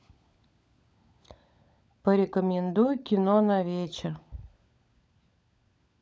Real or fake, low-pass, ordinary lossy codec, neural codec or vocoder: fake; none; none; codec, 16 kHz, 16 kbps, FunCodec, trained on LibriTTS, 50 frames a second